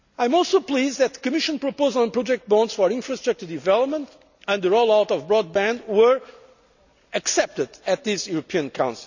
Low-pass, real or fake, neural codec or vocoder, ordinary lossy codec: 7.2 kHz; real; none; none